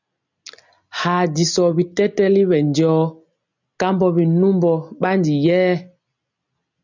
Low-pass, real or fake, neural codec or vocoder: 7.2 kHz; real; none